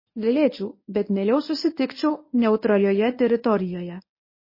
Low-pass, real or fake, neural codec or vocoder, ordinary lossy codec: 5.4 kHz; fake; codec, 24 kHz, 0.9 kbps, WavTokenizer, medium speech release version 1; MP3, 24 kbps